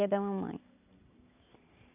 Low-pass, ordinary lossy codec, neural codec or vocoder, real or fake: 3.6 kHz; none; none; real